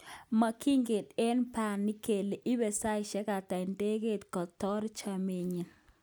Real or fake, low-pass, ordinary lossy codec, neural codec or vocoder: real; none; none; none